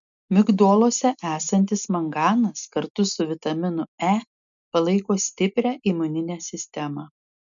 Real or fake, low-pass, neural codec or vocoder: real; 7.2 kHz; none